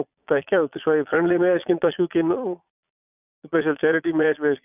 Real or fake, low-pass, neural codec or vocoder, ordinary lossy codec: fake; 3.6 kHz; vocoder, 22.05 kHz, 80 mel bands, Vocos; none